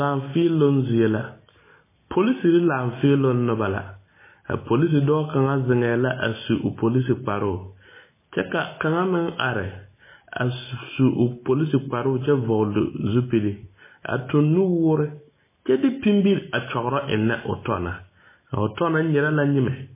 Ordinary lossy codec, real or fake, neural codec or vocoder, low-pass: MP3, 16 kbps; real; none; 3.6 kHz